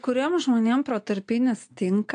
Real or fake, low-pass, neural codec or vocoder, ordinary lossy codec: real; 9.9 kHz; none; AAC, 48 kbps